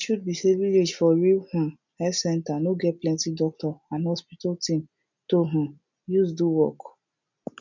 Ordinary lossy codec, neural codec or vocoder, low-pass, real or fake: none; none; 7.2 kHz; real